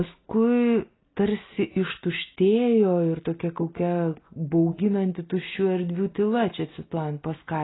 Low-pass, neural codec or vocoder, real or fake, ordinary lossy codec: 7.2 kHz; none; real; AAC, 16 kbps